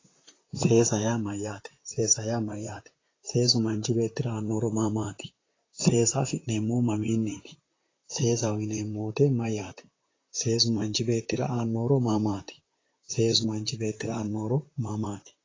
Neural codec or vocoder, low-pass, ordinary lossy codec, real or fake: vocoder, 44.1 kHz, 128 mel bands, Pupu-Vocoder; 7.2 kHz; AAC, 32 kbps; fake